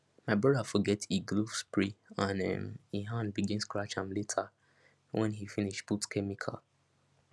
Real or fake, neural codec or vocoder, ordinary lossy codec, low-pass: real; none; none; none